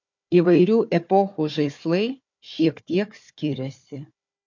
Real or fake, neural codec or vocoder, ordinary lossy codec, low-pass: fake; codec, 16 kHz, 4 kbps, FunCodec, trained on Chinese and English, 50 frames a second; MP3, 48 kbps; 7.2 kHz